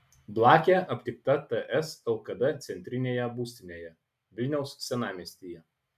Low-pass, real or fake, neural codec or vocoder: 14.4 kHz; real; none